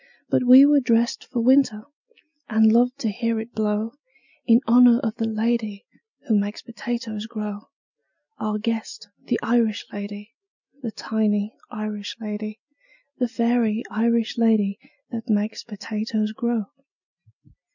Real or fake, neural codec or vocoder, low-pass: real; none; 7.2 kHz